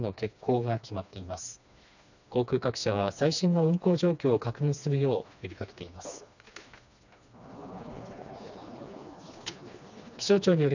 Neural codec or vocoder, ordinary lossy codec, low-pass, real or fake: codec, 16 kHz, 2 kbps, FreqCodec, smaller model; none; 7.2 kHz; fake